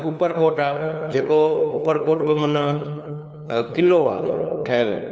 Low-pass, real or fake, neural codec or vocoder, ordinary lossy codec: none; fake; codec, 16 kHz, 2 kbps, FunCodec, trained on LibriTTS, 25 frames a second; none